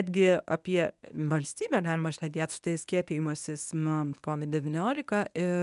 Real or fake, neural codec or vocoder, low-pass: fake; codec, 24 kHz, 0.9 kbps, WavTokenizer, medium speech release version 1; 10.8 kHz